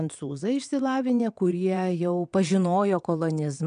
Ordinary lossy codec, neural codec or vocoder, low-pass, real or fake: MP3, 96 kbps; vocoder, 22.05 kHz, 80 mel bands, WaveNeXt; 9.9 kHz; fake